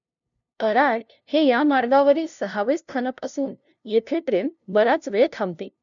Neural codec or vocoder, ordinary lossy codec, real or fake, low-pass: codec, 16 kHz, 0.5 kbps, FunCodec, trained on LibriTTS, 25 frames a second; none; fake; 7.2 kHz